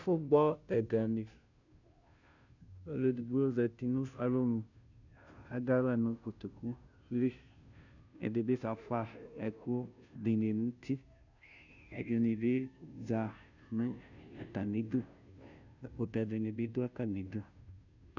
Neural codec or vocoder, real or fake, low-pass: codec, 16 kHz, 0.5 kbps, FunCodec, trained on Chinese and English, 25 frames a second; fake; 7.2 kHz